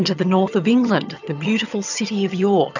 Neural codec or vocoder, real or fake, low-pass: vocoder, 22.05 kHz, 80 mel bands, HiFi-GAN; fake; 7.2 kHz